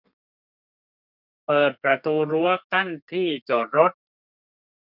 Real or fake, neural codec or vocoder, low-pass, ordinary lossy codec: fake; codec, 32 kHz, 1.9 kbps, SNAC; 5.4 kHz; none